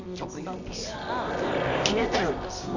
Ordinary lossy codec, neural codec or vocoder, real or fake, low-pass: none; codec, 24 kHz, 0.9 kbps, WavTokenizer, medium music audio release; fake; 7.2 kHz